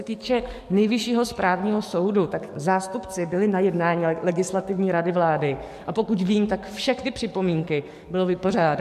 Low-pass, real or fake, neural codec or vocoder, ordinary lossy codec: 14.4 kHz; fake; codec, 44.1 kHz, 7.8 kbps, DAC; MP3, 64 kbps